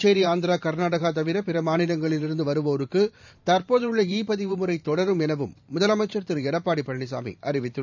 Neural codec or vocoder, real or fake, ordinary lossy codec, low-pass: vocoder, 44.1 kHz, 128 mel bands every 512 samples, BigVGAN v2; fake; none; 7.2 kHz